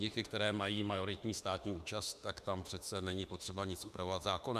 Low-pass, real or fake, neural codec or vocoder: 14.4 kHz; fake; autoencoder, 48 kHz, 32 numbers a frame, DAC-VAE, trained on Japanese speech